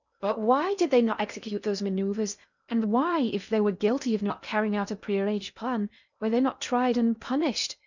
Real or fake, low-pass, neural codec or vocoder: fake; 7.2 kHz; codec, 16 kHz in and 24 kHz out, 0.6 kbps, FocalCodec, streaming, 4096 codes